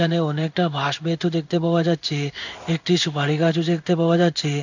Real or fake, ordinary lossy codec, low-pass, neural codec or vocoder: fake; none; 7.2 kHz; codec, 16 kHz in and 24 kHz out, 1 kbps, XY-Tokenizer